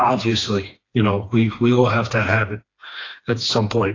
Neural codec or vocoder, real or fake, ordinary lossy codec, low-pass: codec, 16 kHz, 2 kbps, FreqCodec, smaller model; fake; AAC, 32 kbps; 7.2 kHz